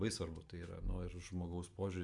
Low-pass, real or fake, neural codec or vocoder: 10.8 kHz; real; none